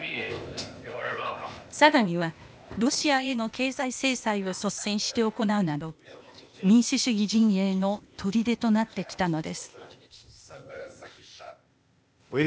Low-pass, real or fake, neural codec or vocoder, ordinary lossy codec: none; fake; codec, 16 kHz, 0.8 kbps, ZipCodec; none